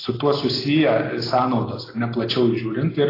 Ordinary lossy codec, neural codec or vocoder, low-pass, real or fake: AAC, 24 kbps; none; 5.4 kHz; real